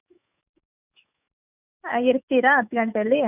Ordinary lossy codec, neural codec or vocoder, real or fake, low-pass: none; autoencoder, 48 kHz, 128 numbers a frame, DAC-VAE, trained on Japanese speech; fake; 3.6 kHz